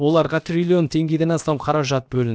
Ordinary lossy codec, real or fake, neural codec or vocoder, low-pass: none; fake; codec, 16 kHz, about 1 kbps, DyCAST, with the encoder's durations; none